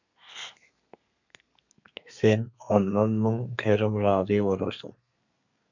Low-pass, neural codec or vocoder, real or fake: 7.2 kHz; codec, 32 kHz, 1.9 kbps, SNAC; fake